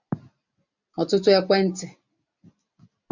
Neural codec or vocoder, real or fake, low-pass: none; real; 7.2 kHz